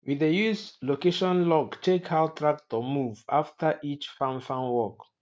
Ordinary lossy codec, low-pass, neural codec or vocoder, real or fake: none; none; none; real